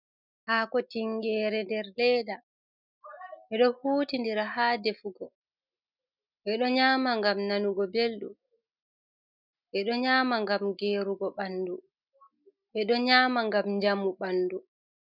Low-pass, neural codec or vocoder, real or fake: 5.4 kHz; none; real